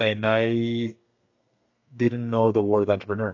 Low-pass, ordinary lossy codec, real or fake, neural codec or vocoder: 7.2 kHz; AAC, 48 kbps; fake; codec, 32 kHz, 1.9 kbps, SNAC